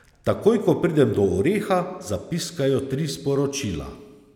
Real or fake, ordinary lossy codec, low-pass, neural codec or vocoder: real; none; 19.8 kHz; none